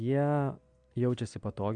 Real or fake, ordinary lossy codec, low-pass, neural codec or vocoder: real; MP3, 64 kbps; 9.9 kHz; none